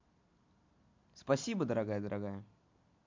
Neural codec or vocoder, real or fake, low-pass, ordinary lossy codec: none; real; 7.2 kHz; MP3, 48 kbps